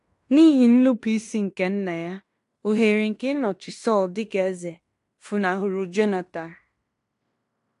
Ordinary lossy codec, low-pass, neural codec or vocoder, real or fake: AAC, 64 kbps; 10.8 kHz; codec, 16 kHz in and 24 kHz out, 0.9 kbps, LongCat-Audio-Codec, fine tuned four codebook decoder; fake